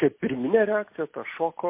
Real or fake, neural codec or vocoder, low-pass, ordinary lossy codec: real; none; 3.6 kHz; MP3, 24 kbps